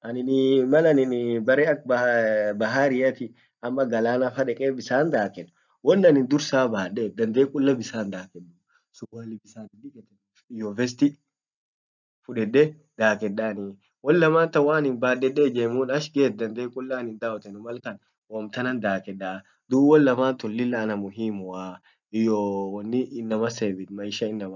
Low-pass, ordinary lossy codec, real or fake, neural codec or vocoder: 7.2 kHz; none; real; none